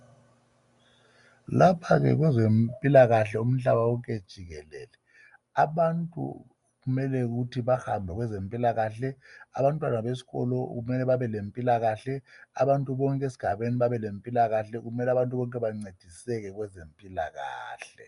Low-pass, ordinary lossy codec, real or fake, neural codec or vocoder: 10.8 kHz; MP3, 96 kbps; real; none